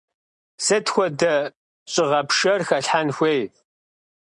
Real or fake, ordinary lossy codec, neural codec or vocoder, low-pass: real; MP3, 96 kbps; none; 9.9 kHz